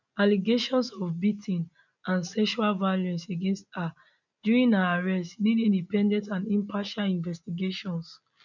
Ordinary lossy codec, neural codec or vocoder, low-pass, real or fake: none; none; 7.2 kHz; real